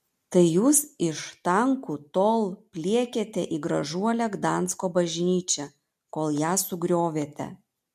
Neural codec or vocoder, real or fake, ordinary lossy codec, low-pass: none; real; MP3, 64 kbps; 14.4 kHz